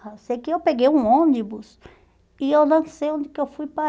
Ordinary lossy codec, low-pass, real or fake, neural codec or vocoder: none; none; real; none